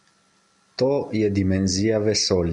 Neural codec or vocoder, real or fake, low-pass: none; real; 10.8 kHz